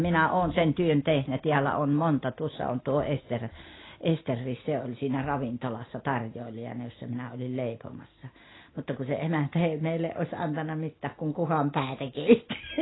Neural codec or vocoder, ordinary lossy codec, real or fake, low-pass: none; AAC, 16 kbps; real; 7.2 kHz